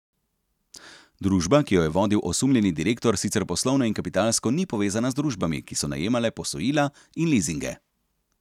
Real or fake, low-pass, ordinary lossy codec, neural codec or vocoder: real; 19.8 kHz; none; none